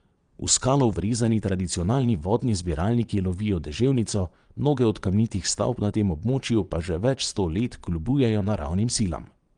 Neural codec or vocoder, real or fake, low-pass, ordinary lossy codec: vocoder, 22.05 kHz, 80 mel bands, Vocos; fake; 9.9 kHz; Opus, 32 kbps